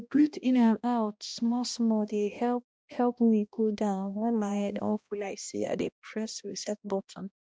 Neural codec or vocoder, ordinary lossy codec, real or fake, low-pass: codec, 16 kHz, 1 kbps, X-Codec, HuBERT features, trained on balanced general audio; none; fake; none